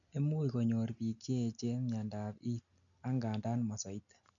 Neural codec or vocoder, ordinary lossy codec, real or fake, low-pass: none; none; real; 7.2 kHz